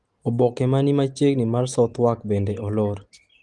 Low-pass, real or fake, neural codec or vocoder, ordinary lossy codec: 10.8 kHz; real; none; Opus, 24 kbps